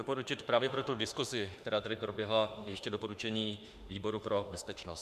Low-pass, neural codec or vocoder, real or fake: 14.4 kHz; autoencoder, 48 kHz, 32 numbers a frame, DAC-VAE, trained on Japanese speech; fake